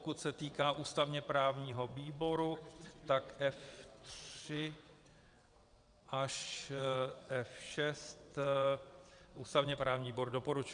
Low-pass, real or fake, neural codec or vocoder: 9.9 kHz; fake; vocoder, 22.05 kHz, 80 mel bands, WaveNeXt